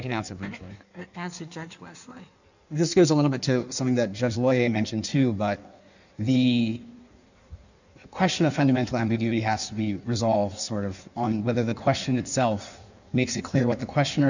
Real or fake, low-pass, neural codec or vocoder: fake; 7.2 kHz; codec, 16 kHz in and 24 kHz out, 1.1 kbps, FireRedTTS-2 codec